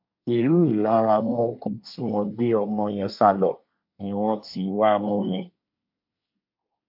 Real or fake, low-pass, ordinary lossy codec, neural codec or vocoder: fake; 5.4 kHz; MP3, 48 kbps; codec, 24 kHz, 1 kbps, SNAC